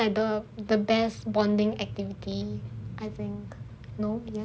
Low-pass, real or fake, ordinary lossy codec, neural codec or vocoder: none; real; none; none